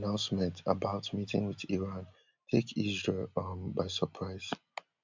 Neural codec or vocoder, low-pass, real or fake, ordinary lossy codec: none; 7.2 kHz; real; MP3, 64 kbps